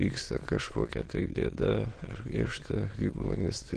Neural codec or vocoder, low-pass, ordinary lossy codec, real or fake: autoencoder, 22.05 kHz, a latent of 192 numbers a frame, VITS, trained on many speakers; 9.9 kHz; Opus, 16 kbps; fake